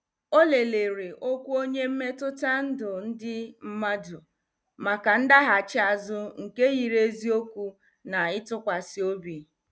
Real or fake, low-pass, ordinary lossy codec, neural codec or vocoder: real; none; none; none